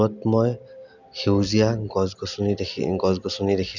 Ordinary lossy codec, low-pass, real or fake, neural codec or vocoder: none; 7.2 kHz; real; none